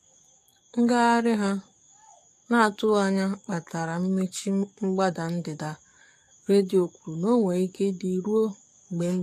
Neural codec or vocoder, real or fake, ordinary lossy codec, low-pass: codec, 44.1 kHz, 7.8 kbps, DAC; fake; AAC, 64 kbps; 14.4 kHz